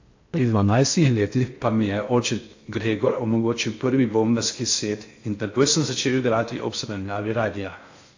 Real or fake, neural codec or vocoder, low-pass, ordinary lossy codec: fake; codec, 16 kHz in and 24 kHz out, 0.6 kbps, FocalCodec, streaming, 4096 codes; 7.2 kHz; AAC, 48 kbps